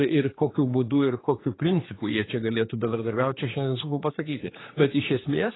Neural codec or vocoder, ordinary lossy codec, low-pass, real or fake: codec, 16 kHz, 4 kbps, X-Codec, HuBERT features, trained on general audio; AAC, 16 kbps; 7.2 kHz; fake